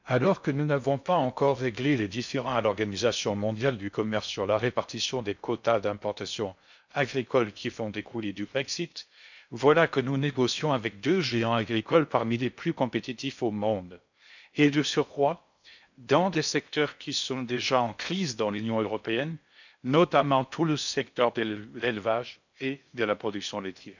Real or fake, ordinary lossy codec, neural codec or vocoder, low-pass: fake; none; codec, 16 kHz in and 24 kHz out, 0.6 kbps, FocalCodec, streaming, 2048 codes; 7.2 kHz